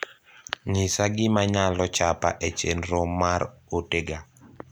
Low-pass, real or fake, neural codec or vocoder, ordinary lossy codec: none; real; none; none